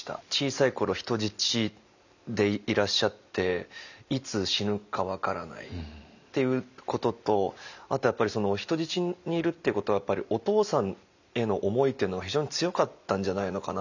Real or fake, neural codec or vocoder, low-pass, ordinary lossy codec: real; none; 7.2 kHz; none